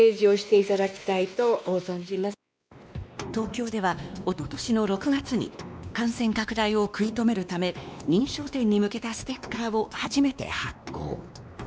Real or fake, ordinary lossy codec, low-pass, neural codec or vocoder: fake; none; none; codec, 16 kHz, 2 kbps, X-Codec, WavLM features, trained on Multilingual LibriSpeech